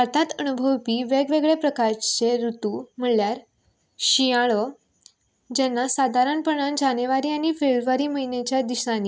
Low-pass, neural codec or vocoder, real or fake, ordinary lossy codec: none; none; real; none